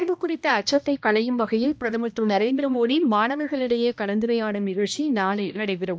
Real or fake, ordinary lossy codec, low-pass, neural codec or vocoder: fake; none; none; codec, 16 kHz, 1 kbps, X-Codec, HuBERT features, trained on balanced general audio